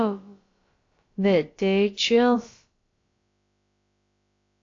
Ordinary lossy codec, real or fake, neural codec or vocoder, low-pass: AAC, 48 kbps; fake; codec, 16 kHz, about 1 kbps, DyCAST, with the encoder's durations; 7.2 kHz